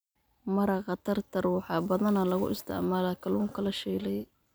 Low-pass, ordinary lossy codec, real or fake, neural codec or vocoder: none; none; real; none